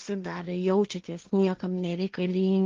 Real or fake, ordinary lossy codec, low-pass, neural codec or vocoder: fake; Opus, 32 kbps; 7.2 kHz; codec, 16 kHz, 1.1 kbps, Voila-Tokenizer